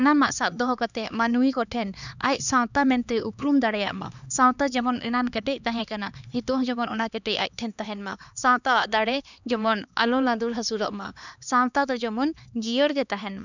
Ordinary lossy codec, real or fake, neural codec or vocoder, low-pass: none; fake; codec, 16 kHz, 2 kbps, X-Codec, HuBERT features, trained on LibriSpeech; 7.2 kHz